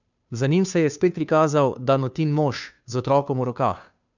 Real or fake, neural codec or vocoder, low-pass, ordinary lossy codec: fake; codec, 16 kHz, 2 kbps, FunCodec, trained on Chinese and English, 25 frames a second; 7.2 kHz; none